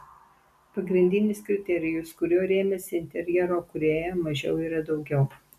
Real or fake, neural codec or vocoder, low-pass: real; none; 14.4 kHz